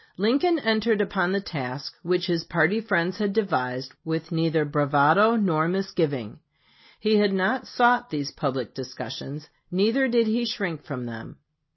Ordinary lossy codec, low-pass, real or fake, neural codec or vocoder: MP3, 24 kbps; 7.2 kHz; real; none